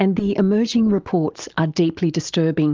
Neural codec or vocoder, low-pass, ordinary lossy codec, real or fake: none; 7.2 kHz; Opus, 24 kbps; real